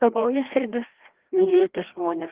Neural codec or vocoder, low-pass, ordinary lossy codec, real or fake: codec, 16 kHz, 1 kbps, FreqCodec, larger model; 3.6 kHz; Opus, 16 kbps; fake